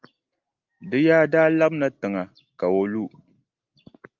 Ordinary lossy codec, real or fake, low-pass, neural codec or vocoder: Opus, 32 kbps; real; 7.2 kHz; none